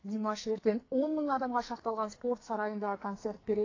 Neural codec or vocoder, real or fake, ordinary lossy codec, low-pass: codec, 44.1 kHz, 2.6 kbps, SNAC; fake; AAC, 32 kbps; 7.2 kHz